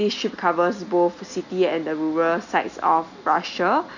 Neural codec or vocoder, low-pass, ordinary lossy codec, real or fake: none; 7.2 kHz; none; real